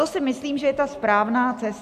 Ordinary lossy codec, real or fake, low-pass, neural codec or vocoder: AAC, 96 kbps; real; 14.4 kHz; none